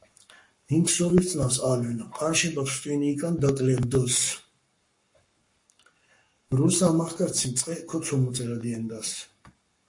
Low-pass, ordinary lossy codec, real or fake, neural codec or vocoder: 10.8 kHz; MP3, 48 kbps; fake; codec, 44.1 kHz, 7.8 kbps, Pupu-Codec